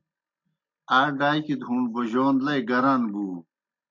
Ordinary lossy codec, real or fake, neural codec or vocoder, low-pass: MP3, 48 kbps; real; none; 7.2 kHz